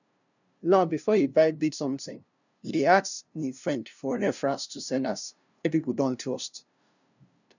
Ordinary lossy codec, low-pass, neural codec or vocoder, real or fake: none; 7.2 kHz; codec, 16 kHz, 0.5 kbps, FunCodec, trained on LibriTTS, 25 frames a second; fake